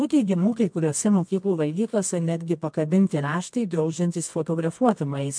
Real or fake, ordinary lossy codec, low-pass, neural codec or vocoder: fake; MP3, 64 kbps; 9.9 kHz; codec, 24 kHz, 0.9 kbps, WavTokenizer, medium music audio release